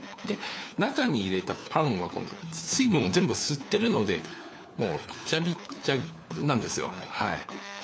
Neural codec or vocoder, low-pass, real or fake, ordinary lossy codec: codec, 16 kHz, 4 kbps, FunCodec, trained on LibriTTS, 50 frames a second; none; fake; none